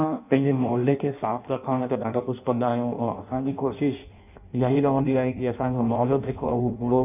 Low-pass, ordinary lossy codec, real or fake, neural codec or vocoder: 3.6 kHz; none; fake; codec, 16 kHz in and 24 kHz out, 0.6 kbps, FireRedTTS-2 codec